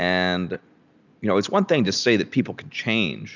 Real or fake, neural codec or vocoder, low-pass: real; none; 7.2 kHz